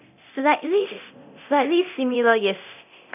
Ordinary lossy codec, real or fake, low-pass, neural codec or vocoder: none; fake; 3.6 kHz; codec, 24 kHz, 0.9 kbps, DualCodec